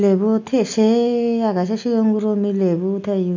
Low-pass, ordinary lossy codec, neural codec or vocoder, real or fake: 7.2 kHz; none; none; real